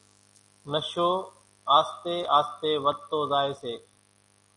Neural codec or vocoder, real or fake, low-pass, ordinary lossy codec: none; real; 10.8 kHz; MP3, 96 kbps